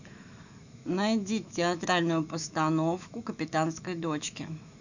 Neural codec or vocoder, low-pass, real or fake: autoencoder, 48 kHz, 128 numbers a frame, DAC-VAE, trained on Japanese speech; 7.2 kHz; fake